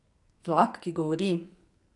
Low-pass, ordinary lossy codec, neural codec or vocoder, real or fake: 10.8 kHz; AAC, 64 kbps; codec, 44.1 kHz, 2.6 kbps, SNAC; fake